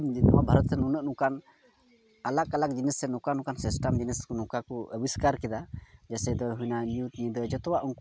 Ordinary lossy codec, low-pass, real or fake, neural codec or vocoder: none; none; real; none